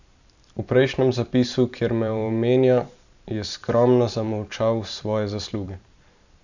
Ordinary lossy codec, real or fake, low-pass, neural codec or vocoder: none; fake; 7.2 kHz; vocoder, 44.1 kHz, 128 mel bands every 512 samples, BigVGAN v2